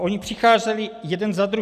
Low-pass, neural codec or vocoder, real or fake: 14.4 kHz; none; real